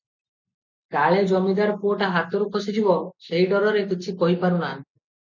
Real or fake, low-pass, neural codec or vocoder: real; 7.2 kHz; none